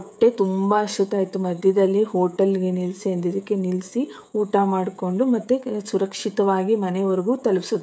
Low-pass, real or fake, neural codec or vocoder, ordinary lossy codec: none; fake; codec, 16 kHz, 16 kbps, FreqCodec, smaller model; none